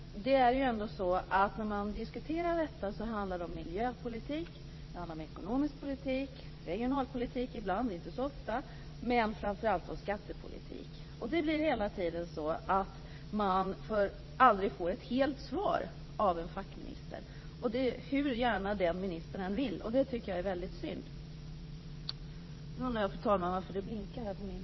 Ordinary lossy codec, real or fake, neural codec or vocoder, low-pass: MP3, 24 kbps; fake; vocoder, 22.05 kHz, 80 mel bands, Vocos; 7.2 kHz